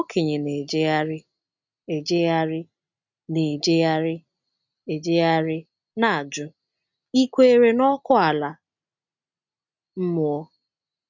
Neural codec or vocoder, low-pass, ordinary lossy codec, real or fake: none; 7.2 kHz; none; real